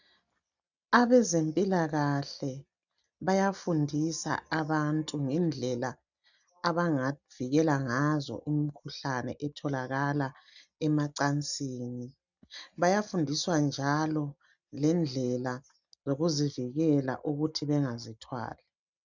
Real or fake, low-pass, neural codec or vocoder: real; 7.2 kHz; none